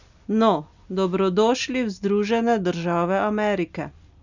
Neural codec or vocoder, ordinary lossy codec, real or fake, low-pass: none; none; real; 7.2 kHz